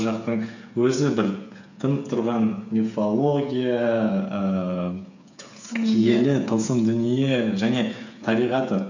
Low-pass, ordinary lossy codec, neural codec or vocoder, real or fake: 7.2 kHz; none; codec, 16 kHz, 8 kbps, FreqCodec, smaller model; fake